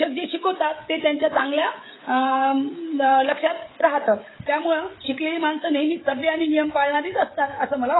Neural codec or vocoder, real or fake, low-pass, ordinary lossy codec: codec, 16 kHz, 16 kbps, FreqCodec, smaller model; fake; 7.2 kHz; AAC, 16 kbps